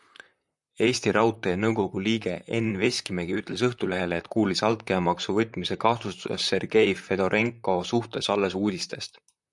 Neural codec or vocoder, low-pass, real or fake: vocoder, 44.1 kHz, 128 mel bands, Pupu-Vocoder; 10.8 kHz; fake